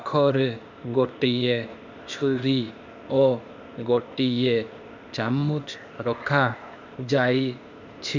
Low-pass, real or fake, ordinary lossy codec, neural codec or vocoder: 7.2 kHz; fake; none; codec, 16 kHz, 0.8 kbps, ZipCodec